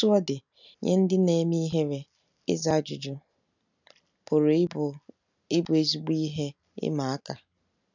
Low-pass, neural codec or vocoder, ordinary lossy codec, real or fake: 7.2 kHz; none; none; real